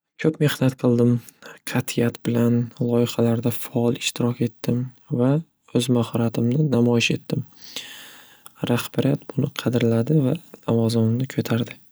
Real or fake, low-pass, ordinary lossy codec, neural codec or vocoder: real; none; none; none